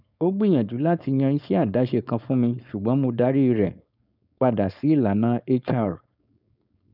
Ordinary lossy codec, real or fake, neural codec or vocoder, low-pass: none; fake; codec, 16 kHz, 4.8 kbps, FACodec; 5.4 kHz